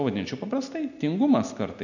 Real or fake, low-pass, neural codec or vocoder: real; 7.2 kHz; none